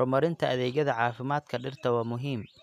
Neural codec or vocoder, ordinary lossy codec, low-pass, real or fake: none; none; 14.4 kHz; real